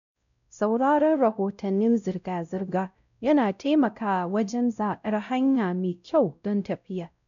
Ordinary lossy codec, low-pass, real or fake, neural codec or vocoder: none; 7.2 kHz; fake; codec, 16 kHz, 0.5 kbps, X-Codec, WavLM features, trained on Multilingual LibriSpeech